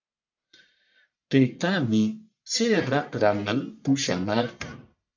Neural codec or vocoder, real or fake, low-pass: codec, 44.1 kHz, 1.7 kbps, Pupu-Codec; fake; 7.2 kHz